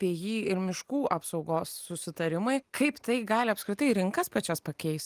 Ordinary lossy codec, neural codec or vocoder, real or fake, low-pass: Opus, 32 kbps; none; real; 14.4 kHz